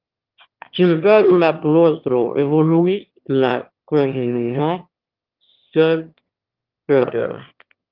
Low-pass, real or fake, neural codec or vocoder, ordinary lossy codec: 5.4 kHz; fake; autoencoder, 22.05 kHz, a latent of 192 numbers a frame, VITS, trained on one speaker; Opus, 24 kbps